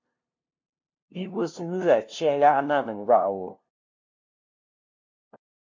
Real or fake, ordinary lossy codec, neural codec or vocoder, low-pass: fake; MP3, 48 kbps; codec, 16 kHz, 0.5 kbps, FunCodec, trained on LibriTTS, 25 frames a second; 7.2 kHz